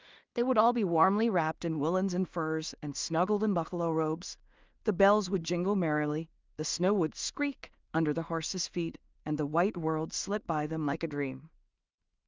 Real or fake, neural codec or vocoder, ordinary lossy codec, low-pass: fake; codec, 16 kHz in and 24 kHz out, 0.4 kbps, LongCat-Audio-Codec, two codebook decoder; Opus, 24 kbps; 7.2 kHz